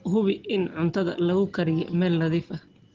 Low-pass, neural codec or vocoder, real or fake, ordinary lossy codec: 7.2 kHz; none; real; Opus, 16 kbps